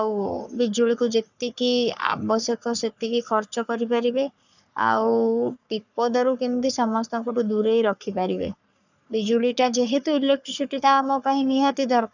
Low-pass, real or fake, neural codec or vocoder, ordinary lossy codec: 7.2 kHz; fake; codec, 44.1 kHz, 3.4 kbps, Pupu-Codec; none